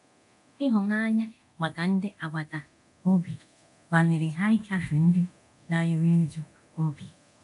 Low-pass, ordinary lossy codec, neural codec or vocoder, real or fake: 10.8 kHz; none; codec, 24 kHz, 0.9 kbps, DualCodec; fake